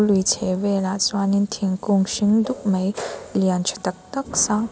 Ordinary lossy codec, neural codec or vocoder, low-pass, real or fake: none; none; none; real